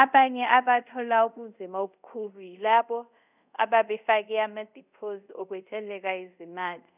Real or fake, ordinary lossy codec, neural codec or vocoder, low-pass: fake; none; codec, 24 kHz, 0.5 kbps, DualCodec; 3.6 kHz